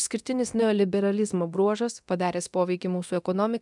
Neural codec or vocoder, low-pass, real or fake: codec, 24 kHz, 0.9 kbps, DualCodec; 10.8 kHz; fake